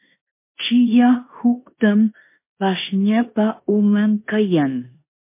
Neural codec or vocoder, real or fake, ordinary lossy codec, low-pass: codec, 16 kHz in and 24 kHz out, 0.9 kbps, LongCat-Audio-Codec, four codebook decoder; fake; MP3, 24 kbps; 3.6 kHz